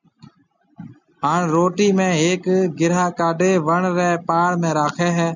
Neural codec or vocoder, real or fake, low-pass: none; real; 7.2 kHz